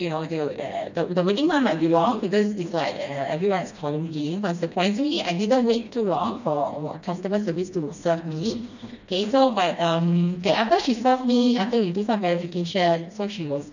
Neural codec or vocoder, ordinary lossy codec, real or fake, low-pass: codec, 16 kHz, 1 kbps, FreqCodec, smaller model; none; fake; 7.2 kHz